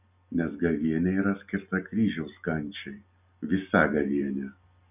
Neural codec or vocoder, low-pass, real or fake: none; 3.6 kHz; real